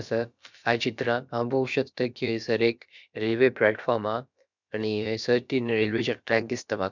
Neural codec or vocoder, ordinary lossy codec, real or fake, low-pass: codec, 16 kHz, about 1 kbps, DyCAST, with the encoder's durations; none; fake; 7.2 kHz